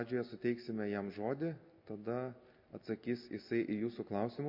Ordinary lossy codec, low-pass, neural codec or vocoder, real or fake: MP3, 32 kbps; 5.4 kHz; none; real